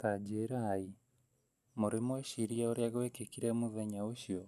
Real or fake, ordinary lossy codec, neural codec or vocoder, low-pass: real; none; none; 14.4 kHz